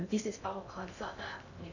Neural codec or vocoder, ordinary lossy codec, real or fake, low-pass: codec, 16 kHz in and 24 kHz out, 0.6 kbps, FocalCodec, streaming, 2048 codes; none; fake; 7.2 kHz